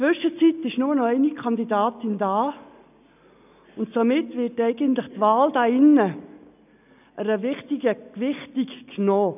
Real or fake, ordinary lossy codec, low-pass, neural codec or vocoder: real; none; 3.6 kHz; none